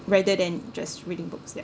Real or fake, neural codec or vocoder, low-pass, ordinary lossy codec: real; none; none; none